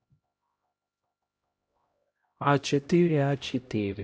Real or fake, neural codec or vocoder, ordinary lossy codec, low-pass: fake; codec, 16 kHz, 0.5 kbps, X-Codec, HuBERT features, trained on LibriSpeech; none; none